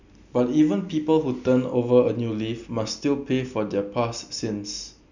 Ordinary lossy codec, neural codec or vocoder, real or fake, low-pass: none; none; real; 7.2 kHz